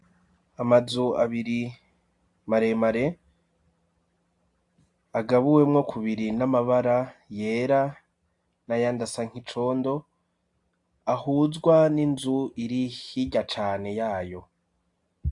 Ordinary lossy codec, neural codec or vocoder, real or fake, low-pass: AAC, 64 kbps; none; real; 10.8 kHz